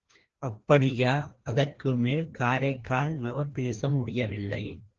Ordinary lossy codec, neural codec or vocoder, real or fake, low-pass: Opus, 16 kbps; codec, 16 kHz, 1 kbps, FreqCodec, larger model; fake; 7.2 kHz